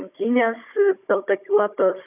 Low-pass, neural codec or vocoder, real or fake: 3.6 kHz; codec, 16 kHz, 16 kbps, FunCodec, trained on Chinese and English, 50 frames a second; fake